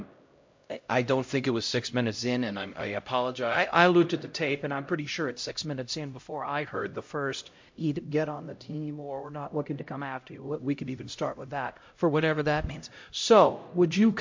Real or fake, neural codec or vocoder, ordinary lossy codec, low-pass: fake; codec, 16 kHz, 0.5 kbps, X-Codec, HuBERT features, trained on LibriSpeech; MP3, 48 kbps; 7.2 kHz